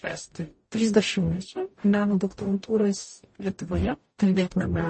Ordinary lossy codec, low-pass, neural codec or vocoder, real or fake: MP3, 32 kbps; 9.9 kHz; codec, 44.1 kHz, 0.9 kbps, DAC; fake